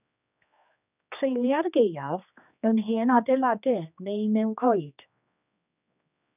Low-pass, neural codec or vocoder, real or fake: 3.6 kHz; codec, 16 kHz, 2 kbps, X-Codec, HuBERT features, trained on general audio; fake